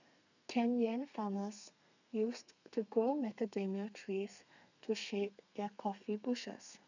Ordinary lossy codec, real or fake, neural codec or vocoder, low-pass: none; fake; codec, 32 kHz, 1.9 kbps, SNAC; 7.2 kHz